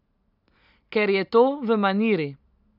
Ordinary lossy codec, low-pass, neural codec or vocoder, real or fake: none; 5.4 kHz; none; real